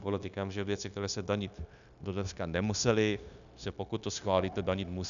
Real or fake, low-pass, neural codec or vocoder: fake; 7.2 kHz; codec, 16 kHz, 0.9 kbps, LongCat-Audio-Codec